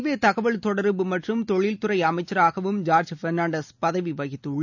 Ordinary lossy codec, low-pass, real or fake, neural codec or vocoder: none; 7.2 kHz; real; none